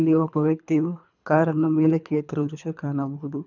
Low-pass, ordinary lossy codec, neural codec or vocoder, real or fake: 7.2 kHz; none; codec, 24 kHz, 3 kbps, HILCodec; fake